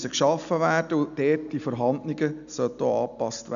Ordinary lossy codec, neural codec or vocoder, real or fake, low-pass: none; none; real; 7.2 kHz